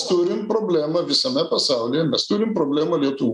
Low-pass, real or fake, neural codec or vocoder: 10.8 kHz; real; none